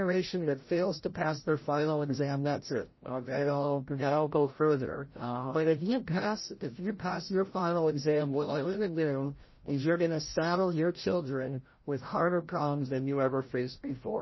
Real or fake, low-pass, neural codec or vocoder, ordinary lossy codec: fake; 7.2 kHz; codec, 16 kHz, 0.5 kbps, FreqCodec, larger model; MP3, 24 kbps